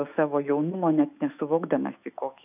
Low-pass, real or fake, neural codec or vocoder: 3.6 kHz; fake; autoencoder, 48 kHz, 128 numbers a frame, DAC-VAE, trained on Japanese speech